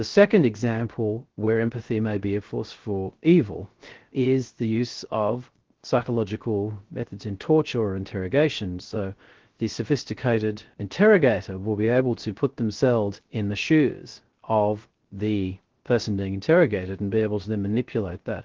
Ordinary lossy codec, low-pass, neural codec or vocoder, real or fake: Opus, 16 kbps; 7.2 kHz; codec, 16 kHz, 0.3 kbps, FocalCodec; fake